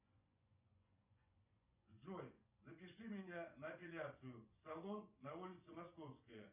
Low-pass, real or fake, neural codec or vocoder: 3.6 kHz; fake; vocoder, 24 kHz, 100 mel bands, Vocos